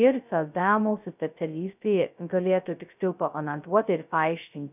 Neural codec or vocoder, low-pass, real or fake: codec, 16 kHz, 0.2 kbps, FocalCodec; 3.6 kHz; fake